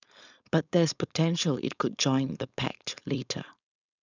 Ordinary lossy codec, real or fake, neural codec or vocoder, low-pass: none; fake; codec, 16 kHz, 4.8 kbps, FACodec; 7.2 kHz